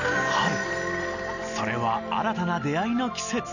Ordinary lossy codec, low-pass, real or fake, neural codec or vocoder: none; 7.2 kHz; real; none